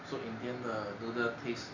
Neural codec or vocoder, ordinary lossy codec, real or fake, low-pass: none; none; real; 7.2 kHz